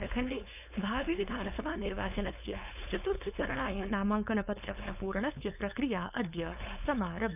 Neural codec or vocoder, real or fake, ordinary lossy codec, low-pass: codec, 16 kHz, 4.8 kbps, FACodec; fake; none; 3.6 kHz